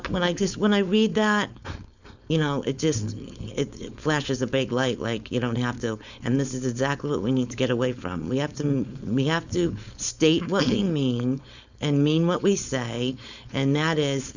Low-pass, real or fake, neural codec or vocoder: 7.2 kHz; fake; codec, 16 kHz, 4.8 kbps, FACodec